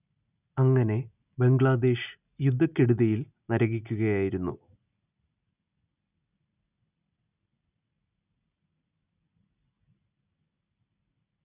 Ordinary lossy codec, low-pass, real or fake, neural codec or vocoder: none; 3.6 kHz; real; none